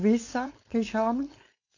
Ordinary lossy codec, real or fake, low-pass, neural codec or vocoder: AAC, 48 kbps; fake; 7.2 kHz; codec, 16 kHz, 4.8 kbps, FACodec